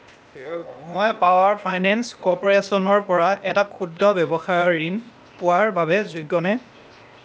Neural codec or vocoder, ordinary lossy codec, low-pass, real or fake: codec, 16 kHz, 0.8 kbps, ZipCodec; none; none; fake